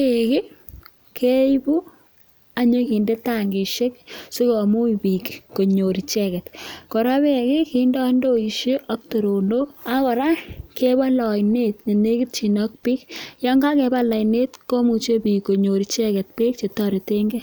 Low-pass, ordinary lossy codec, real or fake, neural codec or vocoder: none; none; real; none